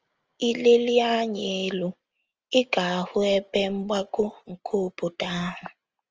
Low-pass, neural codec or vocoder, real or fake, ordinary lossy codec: 7.2 kHz; none; real; Opus, 24 kbps